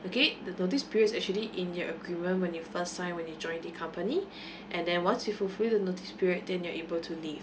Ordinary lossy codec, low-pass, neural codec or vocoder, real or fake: none; none; none; real